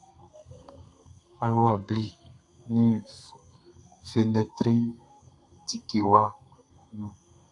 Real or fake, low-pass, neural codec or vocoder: fake; 10.8 kHz; codec, 32 kHz, 1.9 kbps, SNAC